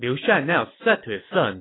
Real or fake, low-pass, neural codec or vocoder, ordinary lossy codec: real; 7.2 kHz; none; AAC, 16 kbps